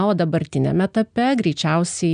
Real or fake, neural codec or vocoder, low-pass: real; none; 9.9 kHz